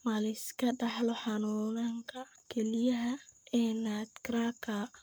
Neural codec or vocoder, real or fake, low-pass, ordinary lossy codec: codec, 44.1 kHz, 7.8 kbps, Pupu-Codec; fake; none; none